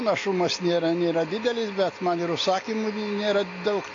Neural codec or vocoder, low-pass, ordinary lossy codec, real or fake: none; 7.2 kHz; AAC, 32 kbps; real